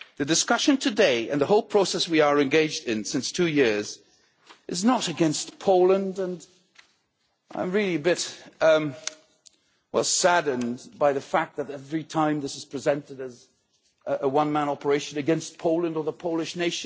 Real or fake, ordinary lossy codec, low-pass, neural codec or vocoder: real; none; none; none